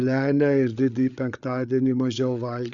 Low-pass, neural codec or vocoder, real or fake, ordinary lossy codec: 7.2 kHz; codec, 16 kHz, 8 kbps, FreqCodec, larger model; fake; Opus, 64 kbps